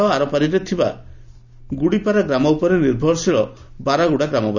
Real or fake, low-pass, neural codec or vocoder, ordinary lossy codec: real; 7.2 kHz; none; none